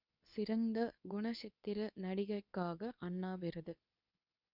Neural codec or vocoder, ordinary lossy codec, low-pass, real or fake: codec, 24 kHz, 0.9 kbps, WavTokenizer, medium speech release version 2; none; 5.4 kHz; fake